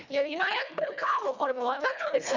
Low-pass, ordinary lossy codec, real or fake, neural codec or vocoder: 7.2 kHz; none; fake; codec, 24 kHz, 1.5 kbps, HILCodec